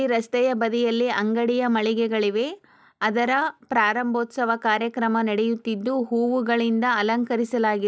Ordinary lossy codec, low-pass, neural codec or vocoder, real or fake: none; none; none; real